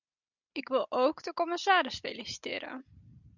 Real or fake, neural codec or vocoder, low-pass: real; none; 7.2 kHz